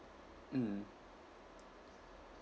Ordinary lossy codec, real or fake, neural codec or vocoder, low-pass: none; real; none; none